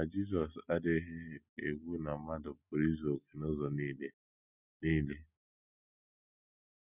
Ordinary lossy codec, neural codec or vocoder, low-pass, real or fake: none; none; 3.6 kHz; real